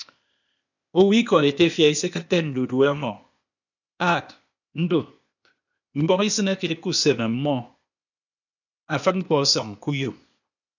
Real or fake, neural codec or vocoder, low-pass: fake; codec, 16 kHz, 0.8 kbps, ZipCodec; 7.2 kHz